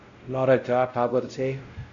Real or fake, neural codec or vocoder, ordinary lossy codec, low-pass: fake; codec, 16 kHz, 0.5 kbps, X-Codec, WavLM features, trained on Multilingual LibriSpeech; AAC, 64 kbps; 7.2 kHz